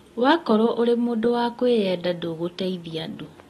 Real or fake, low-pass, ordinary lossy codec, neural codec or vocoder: real; 19.8 kHz; AAC, 32 kbps; none